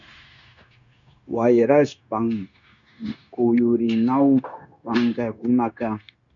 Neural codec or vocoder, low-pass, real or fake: codec, 16 kHz, 0.9 kbps, LongCat-Audio-Codec; 7.2 kHz; fake